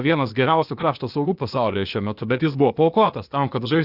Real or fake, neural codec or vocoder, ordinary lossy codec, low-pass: fake; codec, 16 kHz, 0.8 kbps, ZipCodec; Opus, 64 kbps; 5.4 kHz